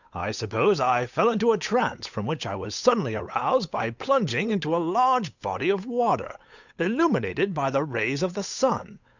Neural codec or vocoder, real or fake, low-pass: codec, 16 kHz, 8 kbps, FunCodec, trained on Chinese and English, 25 frames a second; fake; 7.2 kHz